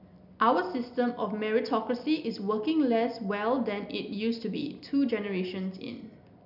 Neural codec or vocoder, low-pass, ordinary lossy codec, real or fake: none; 5.4 kHz; none; real